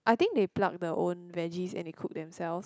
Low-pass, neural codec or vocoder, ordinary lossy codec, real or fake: none; none; none; real